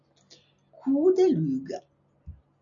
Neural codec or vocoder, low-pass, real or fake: none; 7.2 kHz; real